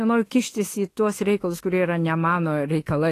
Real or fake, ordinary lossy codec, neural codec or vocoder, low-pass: fake; AAC, 48 kbps; autoencoder, 48 kHz, 32 numbers a frame, DAC-VAE, trained on Japanese speech; 14.4 kHz